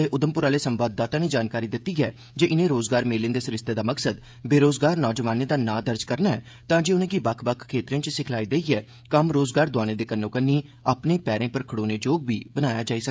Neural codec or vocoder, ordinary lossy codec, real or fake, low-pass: codec, 16 kHz, 16 kbps, FreqCodec, smaller model; none; fake; none